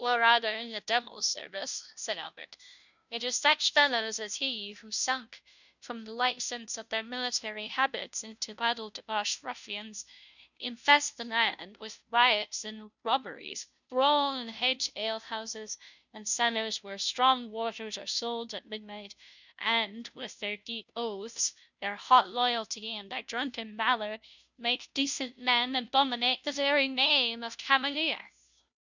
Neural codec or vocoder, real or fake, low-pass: codec, 16 kHz, 0.5 kbps, FunCodec, trained on Chinese and English, 25 frames a second; fake; 7.2 kHz